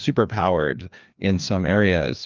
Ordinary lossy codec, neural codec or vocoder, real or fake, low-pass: Opus, 24 kbps; codec, 16 kHz, 2 kbps, FreqCodec, larger model; fake; 7.2 kHz